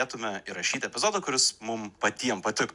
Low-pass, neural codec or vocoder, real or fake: 10.8 kHz; none; real